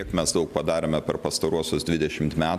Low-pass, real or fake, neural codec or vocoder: 14.4 kHz; real; none